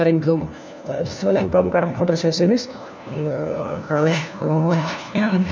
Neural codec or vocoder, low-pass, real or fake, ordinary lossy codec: codec, 16 kHz, 1 kbps, FunCodec, trained on LibriTTS, 50 frames a second; none; fake; none